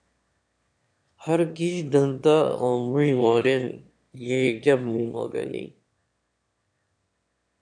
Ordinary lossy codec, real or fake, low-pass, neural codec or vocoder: MP3, 64 kbps; fake; 9.9 kHz; autoencoder, 22.05 kHz, a latent of 192 numbers a frame, VITS, trained on one speaker